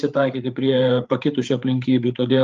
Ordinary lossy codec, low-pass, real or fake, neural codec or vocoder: Opus, 32 kbps; 7.2 kHz; fake; codec, 16 kHz, 8 kbps, FreqCodec, larger model